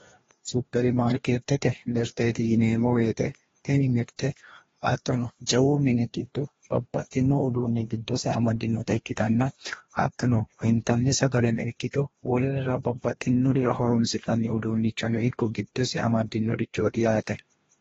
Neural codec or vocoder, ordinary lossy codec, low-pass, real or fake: codec, 16 kHz, 1 kbps, FreqCodec, larger model; AAC, 24 kbps; 7.2 kHz; fake